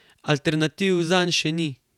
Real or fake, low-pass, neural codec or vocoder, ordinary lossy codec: fake; 19.8 kHz; vocoder, 48 kHz, 128 mel bands, Vocos; none